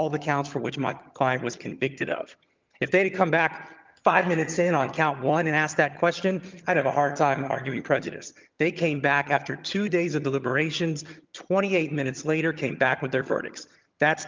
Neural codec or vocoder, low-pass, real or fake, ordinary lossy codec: vocoder, 22.05 kHz, 80 mel bands, HiFi-GAN; 7.2 kHz; fake; Opus, 24 kbps